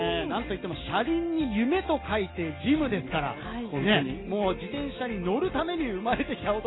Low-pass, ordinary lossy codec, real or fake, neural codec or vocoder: 7.2 kHz; AAC, 16 kbps; real; none